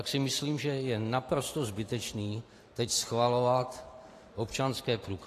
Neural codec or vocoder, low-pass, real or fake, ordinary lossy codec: vocoder, 44.1 kHz, 128 mel bands every 512 samples, BigVGAN v2; 14.4 kHz; fake; AAC, 48 kbps